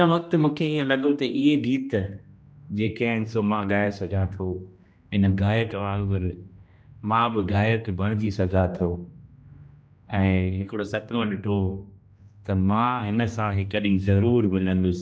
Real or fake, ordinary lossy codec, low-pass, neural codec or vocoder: fake; none; none; codec, 16 kHz, 1 kbps, X-Codec, HuBERT features, trained on general audio